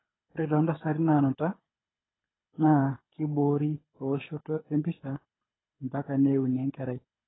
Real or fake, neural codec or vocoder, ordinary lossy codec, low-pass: fake; codec, 24 kHz, 6 kbps, HILCodec; AAC, 16 kbps; 7.2 kHz